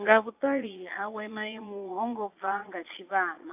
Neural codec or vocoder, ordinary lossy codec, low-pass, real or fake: vocoder, 22.05 kHz, 80 mel bands, Vocos; AAC, 32 kbps; 3.6 kHz; fake